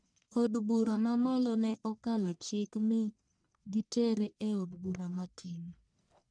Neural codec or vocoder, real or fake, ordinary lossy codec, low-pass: codec, 44.1 kHz, 1.7 kbps, Pupu-Codec; fake; none; 9.9 kHz